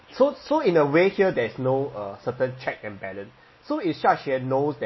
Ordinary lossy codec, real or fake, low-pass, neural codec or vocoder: MP3, 24 kbps; real; 7.2 kHz; none